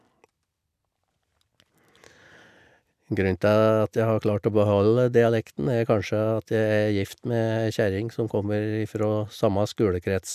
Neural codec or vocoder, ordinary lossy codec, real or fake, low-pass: none; none; real; 14.4 kHz